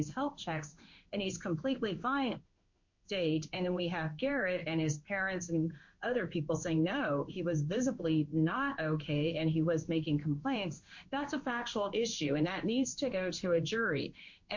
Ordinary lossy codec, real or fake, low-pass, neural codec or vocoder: MP3, 48 kbps; fake; 7.2 kHz; codec, 16 kHz in and 24 kHz out, 1 kbps, XY-Tokenizer